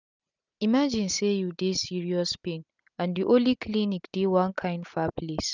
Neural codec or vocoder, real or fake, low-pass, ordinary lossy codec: none; real; 7.2 kHz; none